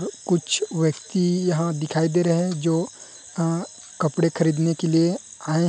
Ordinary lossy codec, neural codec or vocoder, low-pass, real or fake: none; none; none; real